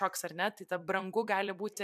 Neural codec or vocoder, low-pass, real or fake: vocoder, 44.1 kHz, 128 mel bands every 256 samples, BigVGAN v2; 14.4 kHz; fake